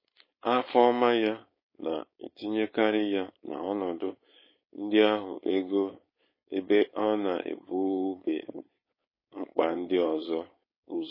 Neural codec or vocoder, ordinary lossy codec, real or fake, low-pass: codec, 16 kHz, 4.8 kbps, FACodec; MP3, 24 kbps; fake; 5.4 kHz